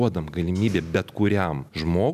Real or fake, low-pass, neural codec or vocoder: real; 14.4 kHz; none